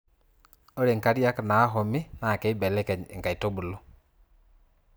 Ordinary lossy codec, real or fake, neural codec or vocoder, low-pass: none; real; none; none